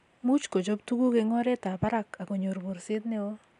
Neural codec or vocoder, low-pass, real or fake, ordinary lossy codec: none; 10.8 kHz; real; none